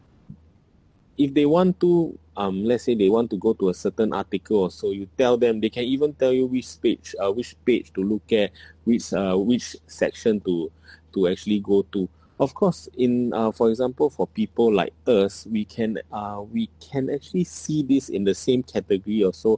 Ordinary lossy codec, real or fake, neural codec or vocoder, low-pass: none; real; none; none